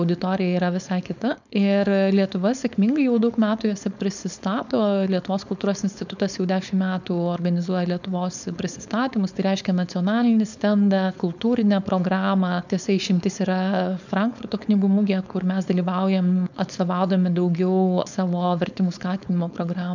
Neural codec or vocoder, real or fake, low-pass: codec, 16 kHz, 4.8 kbps, FACodec; fake; 7.2 kHz